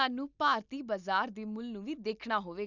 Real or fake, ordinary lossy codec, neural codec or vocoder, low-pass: real; none; none; 7.2 kHz